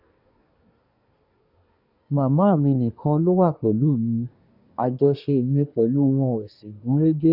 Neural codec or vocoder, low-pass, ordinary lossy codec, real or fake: codec, 24 kHz, 1 kbps, SNAC; 5.4 kHz; none; fake